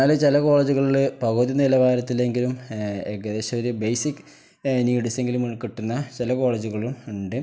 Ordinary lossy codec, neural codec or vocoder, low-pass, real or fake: none; none; none; real